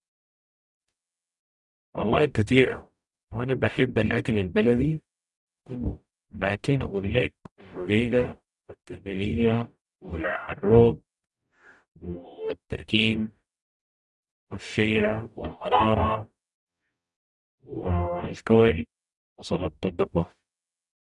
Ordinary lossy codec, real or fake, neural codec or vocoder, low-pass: none; fake; codec, 44.1 kHz, 0.9 kbps, DAC; 10.8 kHz